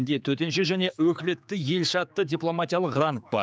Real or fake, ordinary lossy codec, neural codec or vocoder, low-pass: fake; none; codec, 16 kHz, 4 kbps, X-Codec, HuBERT features, trained on general audio; none